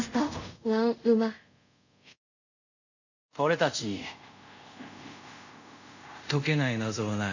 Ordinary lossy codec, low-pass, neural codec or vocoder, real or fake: none; 7.2 kHz; codec, 24 kHz, 0.5 kbps, DualCodec; fake